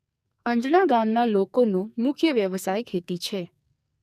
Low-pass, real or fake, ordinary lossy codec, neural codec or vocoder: 14.4 kHz; fake; none; codec, 44.1 kHz, 2.6 kbps, SNAC